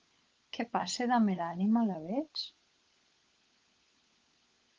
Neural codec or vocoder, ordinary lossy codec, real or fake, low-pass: codec, 16 kHz, 16 kbps, FunCodec, trained on LibriTTS, 50 frames a second; Opus, 24 kbps; fake; 7.2 kHz